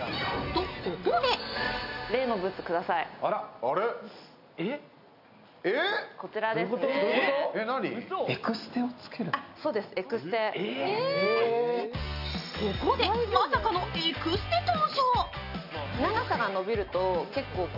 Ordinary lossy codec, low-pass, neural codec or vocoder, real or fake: none; 5.4 kHz; none; real